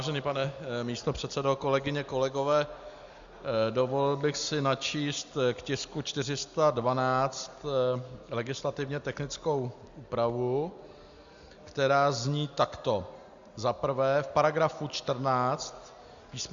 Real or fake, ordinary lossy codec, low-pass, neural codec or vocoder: real; Opus, 64 kbps; 7.2 kHz; none